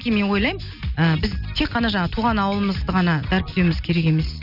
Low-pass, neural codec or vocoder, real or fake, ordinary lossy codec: 5.4 kHz; none; real; none